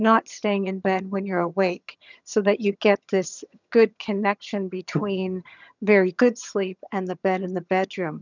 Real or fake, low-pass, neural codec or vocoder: fake; 7.2 kHz; vocoder, 22.05 kHz, 80 mel bands, HiFi-GAN